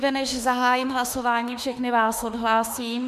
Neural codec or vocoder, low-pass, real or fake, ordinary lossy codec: autoencoder, 48 kHz, 32 numbers a frame, DAC-VAE, trained on Japanese speech; 14.4 kHz; fake; MP3, 96 kbps